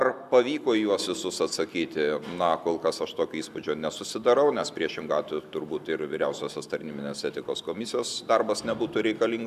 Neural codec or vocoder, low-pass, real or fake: none; 14.4 kHz; real